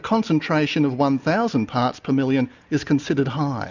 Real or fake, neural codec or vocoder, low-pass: real; none; 7.2 kHz